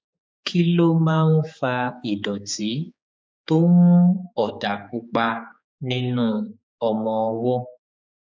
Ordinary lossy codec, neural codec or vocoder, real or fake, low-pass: none; codec, 16 kHz, 4 kbps, X-Codec, HuBERT features, trained on general audio; fake; none